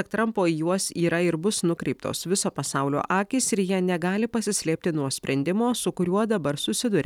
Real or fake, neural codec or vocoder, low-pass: fake; vocoder, 44.1 kHz, 128 mel bands every 256 samples, BigVGAN v2; 19.8 kHz